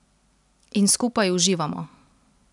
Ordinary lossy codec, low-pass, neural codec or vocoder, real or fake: none; 10.8 kHz; none; real